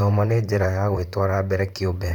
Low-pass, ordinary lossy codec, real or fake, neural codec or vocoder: 19.8 kHz; none; fake; vocoder, 44.1 kHz, 128 mel bands, Pupu-Vocoder